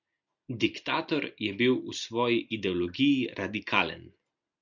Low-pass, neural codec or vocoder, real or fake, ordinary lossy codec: 7.2 kHz; none; real; MP3, 64 kbps